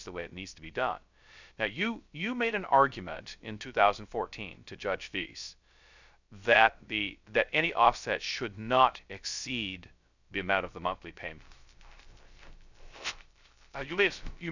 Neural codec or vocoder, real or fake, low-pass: codec, 16 kHz, 0.3 kbps, FocalCodec; fake; 7.2 kHz